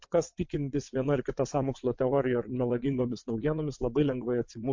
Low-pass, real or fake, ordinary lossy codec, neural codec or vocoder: 7.2 kHz; fake; MP3, 48 kbps; vocoder, 22.05 kHz, 80 mel bands, WaveNeXt